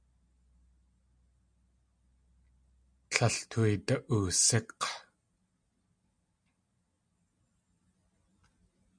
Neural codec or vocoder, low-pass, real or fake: none; 9.9 kHz; real